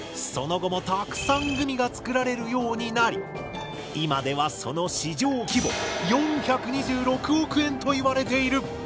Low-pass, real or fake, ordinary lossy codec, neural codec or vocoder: none; real; none; none